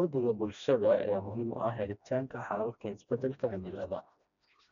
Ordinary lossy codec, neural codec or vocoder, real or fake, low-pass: none; codec, 16 kHz, 1 kbps, FreqCodec, smaller model; fake; 7.2 kHz